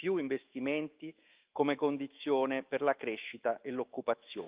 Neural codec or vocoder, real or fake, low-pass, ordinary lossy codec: autoencoder, 48 kHz, 128 numbers a frame, DAC-VAE, trained on Japanese speech; fake; 3.6 kHz; Opus, 32 kbps